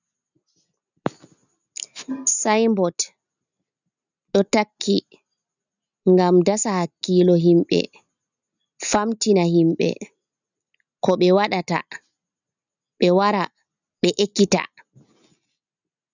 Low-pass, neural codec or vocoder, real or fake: 7.2 kHz; none; real